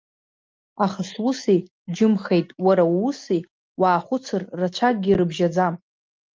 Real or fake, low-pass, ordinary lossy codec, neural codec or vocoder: real; 7.2 kHz; Opus, 24 kbps; none